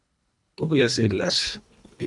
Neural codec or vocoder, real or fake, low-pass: codec, 24 kHz, 1.5 kbps, HILCodec; fake; 10.8 kHz